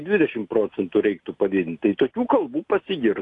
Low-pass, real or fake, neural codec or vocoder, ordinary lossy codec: 10.8 kHz; real; none; AAC, 48 kbps